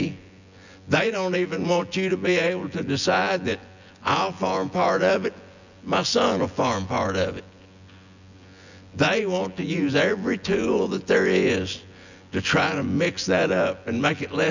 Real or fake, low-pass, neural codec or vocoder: fake; 7.2 kHz; vocoder, 24 kHz, 100 mel bands, Vocos